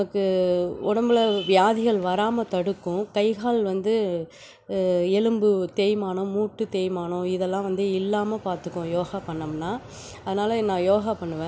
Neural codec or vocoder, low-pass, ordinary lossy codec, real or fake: none; none; none; real